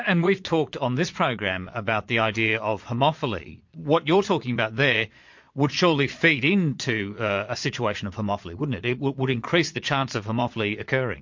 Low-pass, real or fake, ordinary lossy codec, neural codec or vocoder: 7.2 kHz; fake; MP3, 48 kbps; vocoder, 22.05 kHz, 80 mel bands, Vocos